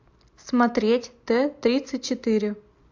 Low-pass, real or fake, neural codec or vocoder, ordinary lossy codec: 7.2 kHz; real; none; AAC, 48 kbps